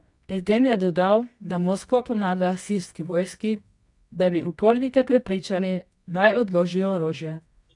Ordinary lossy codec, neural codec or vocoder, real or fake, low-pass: none; codec, 24 kHz, 0.9 kbps, WavTokenizer, medium music audio release; fake; 10.8 kHz